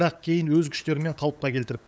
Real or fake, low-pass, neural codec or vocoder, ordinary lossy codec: fake; none; codec, 16 kHz, 8 kbps, FunCodec, trained on LibriTTS, 25 frames a second; none